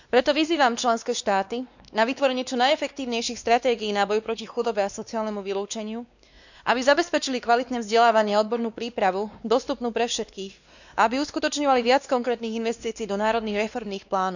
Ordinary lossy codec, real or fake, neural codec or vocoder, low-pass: none; fake; codec, 16 kHz, 2 kbps, X-Codec, WavLM features, trained on Multilingual LibriSpeech; 7.2 kHz